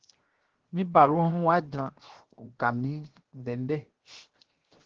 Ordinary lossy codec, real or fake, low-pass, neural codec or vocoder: Opus, 16 kbps; fake; 7.2 kHz; codec, 16 kHz, 0.7 kbps, FocalCodec